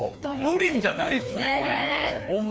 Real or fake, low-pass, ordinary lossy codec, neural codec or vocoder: fake; none; none; codec, 16 kHz, 2 kbps, FreqCodec, larger model